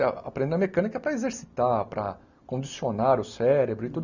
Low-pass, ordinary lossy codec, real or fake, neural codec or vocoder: 7.2 kHz; none; real; none